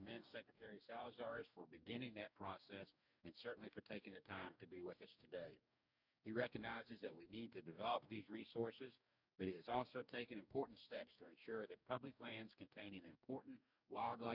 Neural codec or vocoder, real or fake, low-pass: codec, 44.1 kHz, 2.6 kbps, DAC; fake; 5.4 kHz